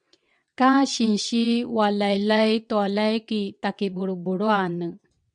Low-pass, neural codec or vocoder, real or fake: 9.9 kHz; vocoder, 22.05 kHz, 80 mel bands, WaveNeXt; fake